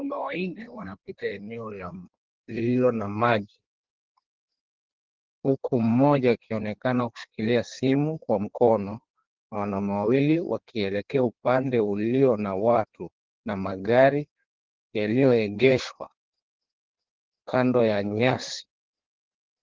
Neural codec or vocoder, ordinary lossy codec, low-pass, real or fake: codec, 16 kHz in and 24 kHz out, 1.1 kbps, FireRedTTS-2 codec; Opus, 16 kbps; 7.2 kHz; fake